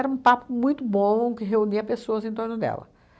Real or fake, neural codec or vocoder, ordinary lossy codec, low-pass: real; none; none; none